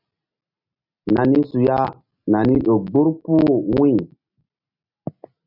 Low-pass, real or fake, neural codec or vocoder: 5.4 kHz; real; none